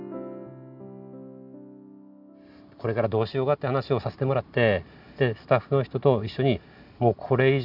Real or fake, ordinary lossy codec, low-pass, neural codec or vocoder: real; none; 5.4 kHz; none